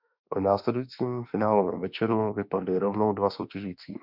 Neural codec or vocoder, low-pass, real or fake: autoencoder, 48 kHz, 32 numbers a frame, DAC-VAE, trained on Japanese speech; 5.4 kHz; fake